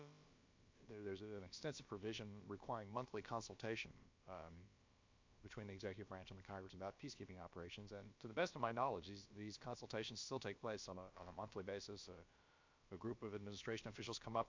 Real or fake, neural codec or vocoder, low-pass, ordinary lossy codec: fake; codec, 16 kHz, about 1 kbps, DyCAST, with the encoder's durations; 7.2 kHz; MP3, 48 kbps